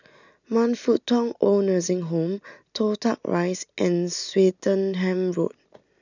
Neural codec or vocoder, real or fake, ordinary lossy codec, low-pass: none; real; none; 7.2 kHz